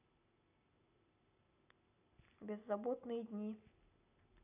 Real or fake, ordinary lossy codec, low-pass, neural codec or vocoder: real; none; 3.6 kHz; none